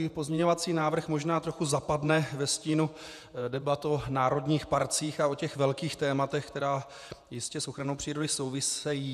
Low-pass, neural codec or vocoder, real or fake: 14.4 kHz; vocoder, 48 kHz, 128 mel bands, Vocos; fake